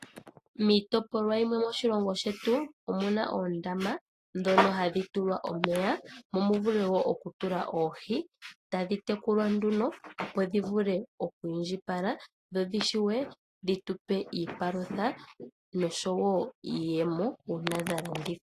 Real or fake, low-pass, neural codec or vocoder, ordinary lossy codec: real; 14.4 kHz; none; AAC, 48 kbps